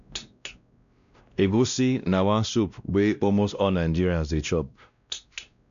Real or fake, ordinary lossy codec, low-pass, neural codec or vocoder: fake; none; 7.2 kHz; codec, 16 kHz, 1 kbps, X-Codec, WavLM features, trained on Multilingual LibriSpeech